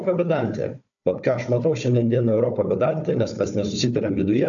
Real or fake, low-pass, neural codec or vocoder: fake; 7.2 kHz; codec, 16 kHz, 4 kbps, FunCodec, trained on Chinese and English, 50 frames a second